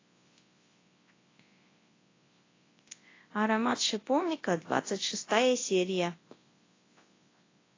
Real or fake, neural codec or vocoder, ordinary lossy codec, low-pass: fake; codec, 24 kHz, 0.9 kbps, WavTokenizer, large speech release; AAC, 32 kbps; 7.2 kHz